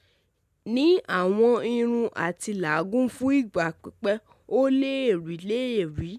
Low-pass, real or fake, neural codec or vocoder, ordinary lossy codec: 14.4 kHz; real; none; none